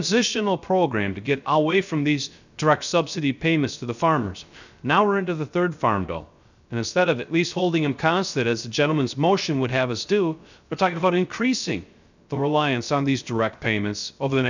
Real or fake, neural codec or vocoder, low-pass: fake; codec, 16 kHz, 0.3 kbps, FocalCodec; 7.2 kHz